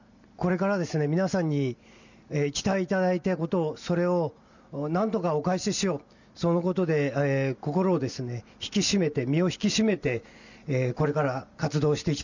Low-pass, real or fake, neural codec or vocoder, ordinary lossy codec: 7.2 kHz; real; none; none